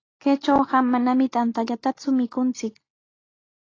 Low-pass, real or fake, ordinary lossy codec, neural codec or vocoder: 7.2 kHz; real; AAC, 32 kbps; none